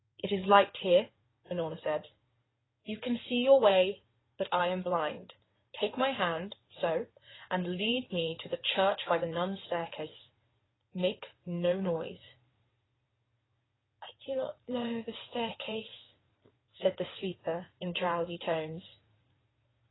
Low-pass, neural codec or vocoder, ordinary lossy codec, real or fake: 7.2 kHz; codec, 16 kHz in and 24 kHz out, 2.2 kbps, FireRedTTS-2 codec; AAC, 16 kbps; fake